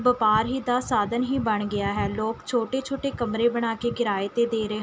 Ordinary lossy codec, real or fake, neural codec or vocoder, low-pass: none; real; none; none